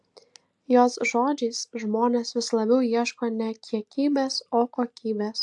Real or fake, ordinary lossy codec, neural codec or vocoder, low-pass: real; AAC, 64 kbps; none; 10.8 kHz